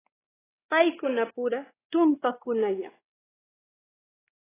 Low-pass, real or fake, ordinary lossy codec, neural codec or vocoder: 3.6 kHz; fake; AAC, 16 kbps; codec, 16 kHz, 2 kbps, X-Codec, WavLM features, trained on Multilingual LibriSpeech